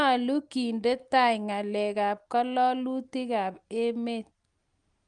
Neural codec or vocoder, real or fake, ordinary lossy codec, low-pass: none; real; Opus, 32 kbps; 9.9 kHz